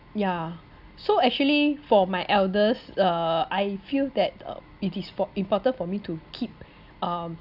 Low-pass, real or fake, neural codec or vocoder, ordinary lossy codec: 5.4 kHz; real; none; none